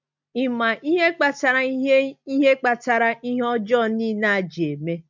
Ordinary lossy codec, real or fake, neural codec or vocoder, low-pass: MP3, 64 kbps; real; none; 7.2 kHz